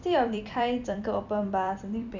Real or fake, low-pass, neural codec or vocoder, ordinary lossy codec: fake; 7.2 kHz; vocoder, 44.1 kHz, 128 mel bands every 256 samples, BigVGAN v2; none